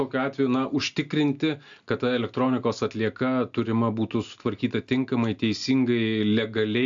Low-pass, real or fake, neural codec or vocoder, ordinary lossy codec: 7.2 kHz; real; none; AAC, 64 kbps